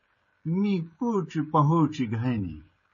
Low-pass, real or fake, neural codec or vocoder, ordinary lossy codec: 7.2 kHz; fake; codec, 16 kHz, 16 kbps, FreqCodec, smaller model; MP3, 32 kbps